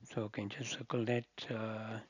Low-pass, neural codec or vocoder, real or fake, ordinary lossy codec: 7.2 kHz; none; real; none